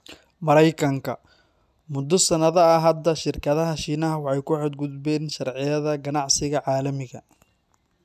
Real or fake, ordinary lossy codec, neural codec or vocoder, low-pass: real; none; none; 14.4 kHz